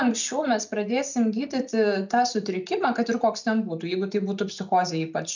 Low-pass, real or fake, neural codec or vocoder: 7.2 kHz; real; none